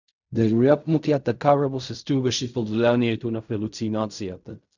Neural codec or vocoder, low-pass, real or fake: codec, 16 kHz in and 24 kHz out, 0.4 kbps, LongCat-Audio-Codec, fine tuned four codebook decoder; 7.2 kHz; fake